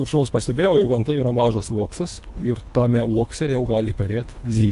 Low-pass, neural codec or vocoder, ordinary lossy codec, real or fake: 10.8 kHz; codec, 24 kHz, 1.5 kbps, HILCodec; AAC, 64 kbps; fake